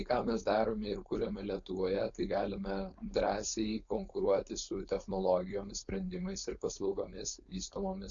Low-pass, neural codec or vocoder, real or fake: 7.2 kHz; codec, 16 kHz, 4.8 kbps, FACodec; fake